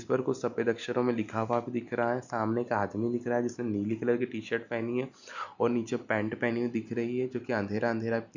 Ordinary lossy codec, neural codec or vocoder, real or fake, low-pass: none; none; real; 7.2 kHz